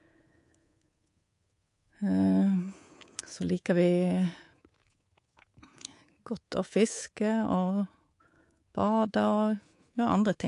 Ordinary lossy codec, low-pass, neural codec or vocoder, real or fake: AAC, 48 kbps; 10.8 kHz; codec, 24 kHz, 3.1 kbps, DualCodec; fake